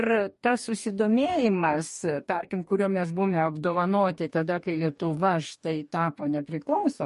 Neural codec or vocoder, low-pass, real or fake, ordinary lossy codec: codec, 44.1 kHz, 2.6 kbps, DAC; 14.4 kHz; fake; MP3, 48 kbps